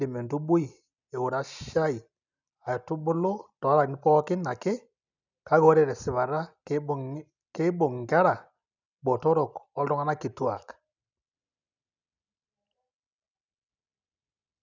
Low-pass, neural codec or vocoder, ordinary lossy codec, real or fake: 7.2 kHz; none; none; real